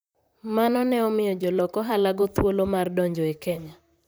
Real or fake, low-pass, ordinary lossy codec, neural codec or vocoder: fake; none; none; vocoder, 44.1 kHz, 128 mel bands, Pupu-Vocoder